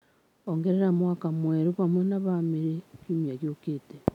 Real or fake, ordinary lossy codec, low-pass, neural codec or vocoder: real; none; 19.8 kHz; none